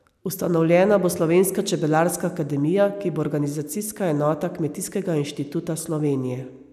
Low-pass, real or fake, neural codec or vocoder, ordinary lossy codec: 14.4 kHz; real; none; none